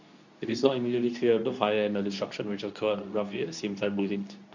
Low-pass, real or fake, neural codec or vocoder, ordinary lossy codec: 7.2 kHz; fake; codec, 24 kHz, 0.9 kbps, WavTokenizer, medium speech release version 2; none